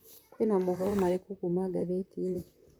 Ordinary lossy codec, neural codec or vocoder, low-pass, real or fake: none; vocoder, 44.1 kHz, 128 mel bands, Pupu-Vocoder; none; fake